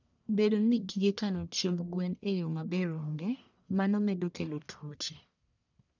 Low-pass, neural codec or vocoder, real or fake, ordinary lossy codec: 7.2 kHz; codec, 44.1 kHz, 1.7 kbps, Pupu-Codec; fake; none